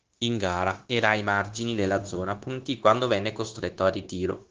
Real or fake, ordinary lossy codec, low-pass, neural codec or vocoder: fake; Opus, 16 kbps; 7.2 kHz; codec, 16 kHz, 0.9 kbps, LongCat-Audio-Codec